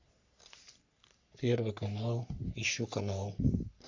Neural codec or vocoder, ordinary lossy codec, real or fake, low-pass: codec, 44.1 kHz, 3.4 kbps, Pupu-Codec; AAC, 48 kbps; fake; 7.2 kHz